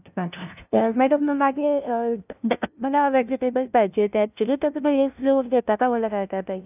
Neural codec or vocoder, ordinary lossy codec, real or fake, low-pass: codec, 16 kHz, 0.5 kbps, FunCodec, trained on LibriTTS, 25 frames a second; none; fake; 3.6 kHz